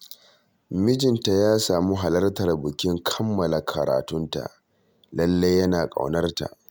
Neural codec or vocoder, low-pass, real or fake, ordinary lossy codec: none; none; real; none